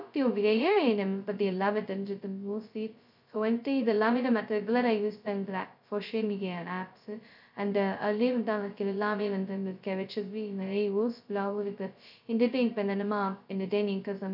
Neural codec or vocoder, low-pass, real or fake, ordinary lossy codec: codec, 16 kHz, 0.2 kbps, FocalCodec; 5.4 kHz; fake; none